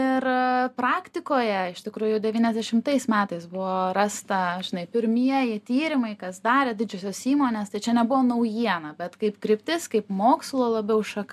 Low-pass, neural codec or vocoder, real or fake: 14.4 kHz; none; real